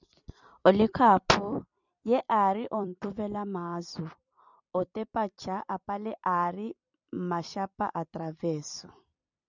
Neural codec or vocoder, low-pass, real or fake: vocoder, 44.1 kHz, 128 mel bands every 256 samples, BigVGAN v2; 7.2 kHz; fake